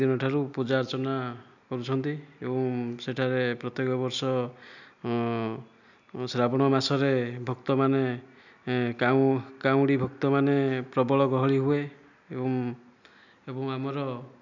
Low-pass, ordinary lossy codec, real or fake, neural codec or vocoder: 7.2 kHz; none; real; none